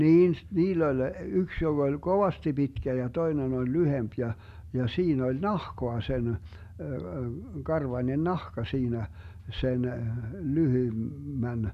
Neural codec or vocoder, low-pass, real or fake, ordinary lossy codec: none; 14.4 kHz; real; none